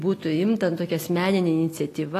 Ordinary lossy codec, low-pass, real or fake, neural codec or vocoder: AAC, 48 kbps; 14.4 kHz; real; none